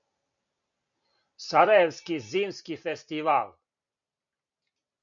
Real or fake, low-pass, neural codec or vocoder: real; 7.2 kHz; none